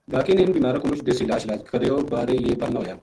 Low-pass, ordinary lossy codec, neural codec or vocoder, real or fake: 10.8 kHz; Opus, 16 kbps; none; real